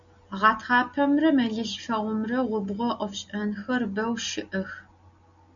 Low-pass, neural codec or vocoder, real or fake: 7.2 kHz; none; real